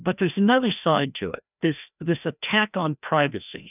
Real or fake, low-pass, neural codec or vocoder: fake; 3.6 kHz; codec, 16 kHz, 1 kbps, FreqCodec, larger model